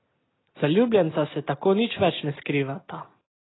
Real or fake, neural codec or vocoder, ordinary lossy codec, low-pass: real; none; AAC, 16 kbps; 7.2 kHz